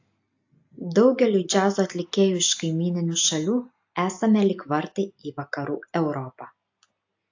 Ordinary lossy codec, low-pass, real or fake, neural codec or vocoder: AAC, 48 kbps; 7.2 kHz; real; none